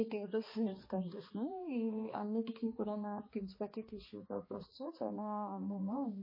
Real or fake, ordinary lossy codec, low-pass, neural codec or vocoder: fake; MP3, 24 kbps; 5.4 kHz; codec, 16 kHz, 2 kbps, X-Codec, HuBERT features, trained on balanced general audio